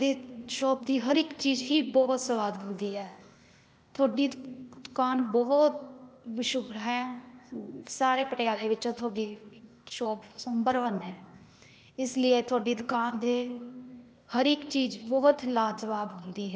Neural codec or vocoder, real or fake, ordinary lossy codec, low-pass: codec, 16 kHz, 0.8 kbps, ZipCodec; fake; none; none